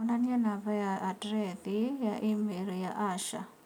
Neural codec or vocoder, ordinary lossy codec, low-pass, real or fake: none; none; 19.8 kHz; real